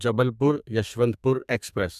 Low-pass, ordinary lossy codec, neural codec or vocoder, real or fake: 14.4 kHz; none; codec, 32 kHz, 1.9 kbps, SNAC; fake